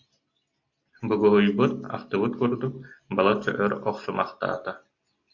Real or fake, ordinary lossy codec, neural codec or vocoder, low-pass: real; AAC, 48 kbps; none; 7.2 kHz